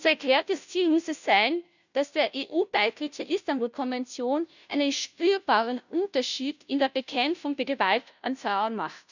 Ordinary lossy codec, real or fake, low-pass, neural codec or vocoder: none; fake; 7.2 kHz; codec, 16 kHz, 0.5 kbps, FunCodec, trained on Chinese and English, 25 frames a second